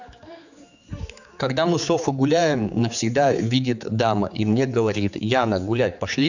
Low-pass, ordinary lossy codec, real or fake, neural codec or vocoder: 7.2 kHz; none; fake; codec, 16 kHz, 4 kbps, X-Codec, HuBERT features, trained on general audio